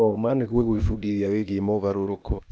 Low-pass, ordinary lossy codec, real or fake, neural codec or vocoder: none; none; fake; codec, 16 kHz, 0.8 kbps, ZipCodec